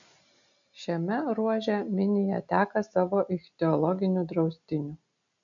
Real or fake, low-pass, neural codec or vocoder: real; 7.2 kHz; none